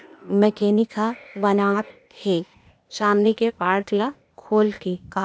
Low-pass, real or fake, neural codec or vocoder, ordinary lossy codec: none; fake; codec, 16 kHz, 0.8 kbps, ZipCodec; none